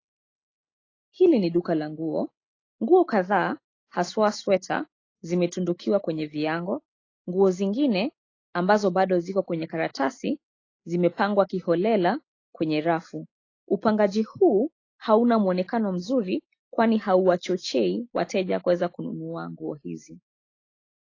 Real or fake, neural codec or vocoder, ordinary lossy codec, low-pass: real; none; AAC, 32 kbps; 7.2 kHz